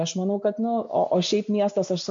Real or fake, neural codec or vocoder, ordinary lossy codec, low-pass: real; none; MP3, 48 kbps; 7.2 kHz